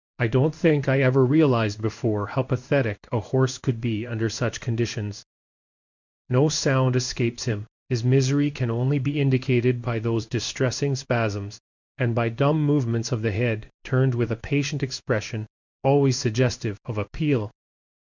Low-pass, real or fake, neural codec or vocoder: 7.2 kHz; fake; codec, 16 kHz in and 24 kHz out, 1 kbps, XY-Tokenizer